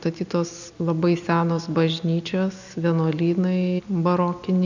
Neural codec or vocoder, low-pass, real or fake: none; 7.2 kHz; real